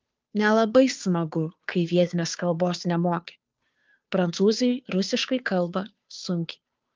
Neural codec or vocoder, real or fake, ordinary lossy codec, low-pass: codec, 16 kHz, 2 kbps, FunCodec, trained on Chinese and English, 25 frames a second; fake; Opus, 24 kbps; 7.2 kHz